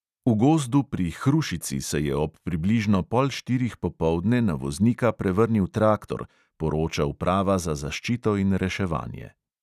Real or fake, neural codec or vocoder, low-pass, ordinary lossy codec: real; none; 14.4 kHz; none